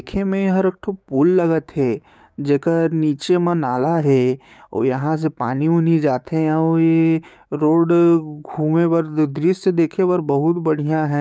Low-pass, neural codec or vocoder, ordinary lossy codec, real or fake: none; codec, 16 kHz, 6 kbps, DAC; none; fake